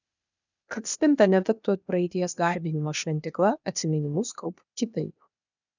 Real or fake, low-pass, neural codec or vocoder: fake; 7.2 kHz; codec, 16 kHz, 0.8 kbps, ZipCodec